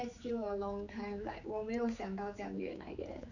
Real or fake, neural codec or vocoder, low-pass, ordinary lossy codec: fake; codec, 16 kHz, 4 kbps, X-Codec, HuBERT features, trained on general audio; 7.2 kHz; none